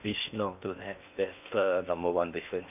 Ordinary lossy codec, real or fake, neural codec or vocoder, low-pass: none; fake; codec, 16 kHz in and 24 kHz out, 0.8 kbps, FocalCodec, streaming, 65536 codes; 3.6 kHz